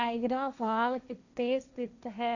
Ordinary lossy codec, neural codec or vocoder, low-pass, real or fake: none; codec, 16 kHz, 1.1 kbps, Voila-Tokenizer; 7.2 kHz; fake